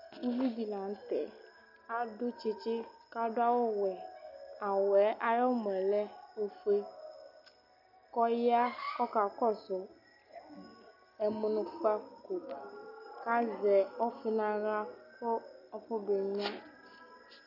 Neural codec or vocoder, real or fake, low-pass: none; real; 5.4 kHz